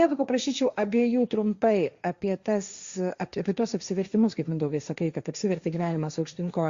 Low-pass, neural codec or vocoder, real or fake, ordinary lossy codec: 7.2 kHz; codec, 16 kHz, 1.1 kbps, Voila-Tokenizer; fake; Opus, 64 kbps